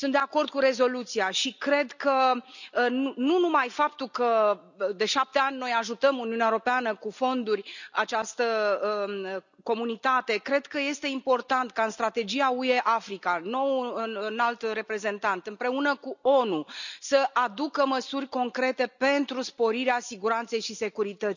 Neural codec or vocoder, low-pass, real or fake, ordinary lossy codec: none; 7.2 kHz; real; none